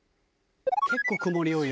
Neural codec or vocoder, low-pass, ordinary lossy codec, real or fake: none; none; none; real